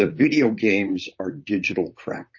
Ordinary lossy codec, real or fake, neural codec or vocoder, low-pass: MP3, 32 kbps; fake; vocoder, 44.1 kHz, 128 mel bands, Pupu-Vocoder; 7.2 kHz